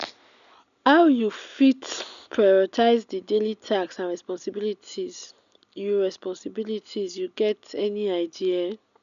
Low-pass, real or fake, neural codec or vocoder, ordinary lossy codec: 7.2 kHz; real; none; none